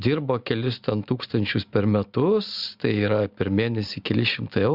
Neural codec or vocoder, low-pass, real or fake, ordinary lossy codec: none; 5.4 kHz; real; Opus, 64 kbps